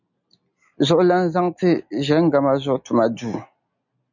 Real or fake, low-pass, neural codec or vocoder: real; 7.2 kHz; none